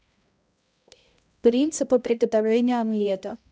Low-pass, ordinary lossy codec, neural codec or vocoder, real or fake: none; none; codec, 16 kHz, 0.5 kbps, X-Codec, HuBERT features, trained on balanced general audio; fake